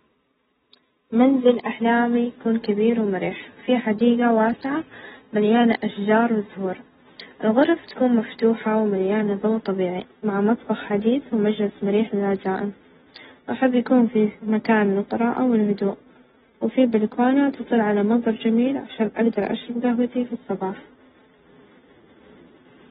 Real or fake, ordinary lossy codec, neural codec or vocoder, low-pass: real; AAC, 16 kbps; none; 19.8 kHz